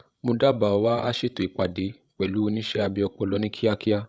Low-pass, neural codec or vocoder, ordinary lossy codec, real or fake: none; codec, 16 kHz, 16 kbps, FreqCodec, larger model; none; fake